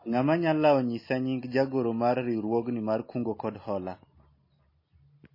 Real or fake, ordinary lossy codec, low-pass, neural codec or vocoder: real; MP3, 24 kbps; 5.4 kHz; none